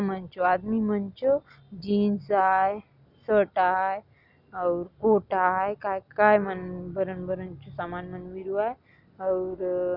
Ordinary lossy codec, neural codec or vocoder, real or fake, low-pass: none; none; real; 5.4 kHz